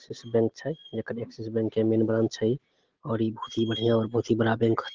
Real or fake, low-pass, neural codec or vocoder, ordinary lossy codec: real; 7.2 kHz; none; Opus, 16 kbps